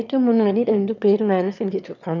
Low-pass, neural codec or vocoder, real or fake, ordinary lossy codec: 7.2 kHz; autoencoder, 22.05 kHz, a latent of 192 numbers a frame, VITS, trained on one speaker; fake; none